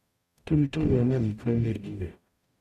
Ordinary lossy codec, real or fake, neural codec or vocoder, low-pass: Opus, 64 kbps; fake; codec, 44.1 kHz, 0.9 kbps, DAC; 14.4 kHz